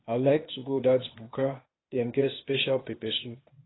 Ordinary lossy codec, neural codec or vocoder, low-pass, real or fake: AAC, 16 kbps; codec, 16 kHz, 0.8 kbps, ZipCodec; 7.2 kHz; fake